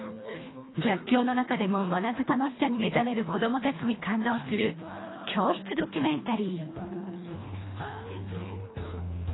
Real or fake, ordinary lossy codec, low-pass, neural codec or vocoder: fake; AAC, 16 kbps; 7.2 kHz; codec, 24 kHz, 1.5 kbps, HILCodec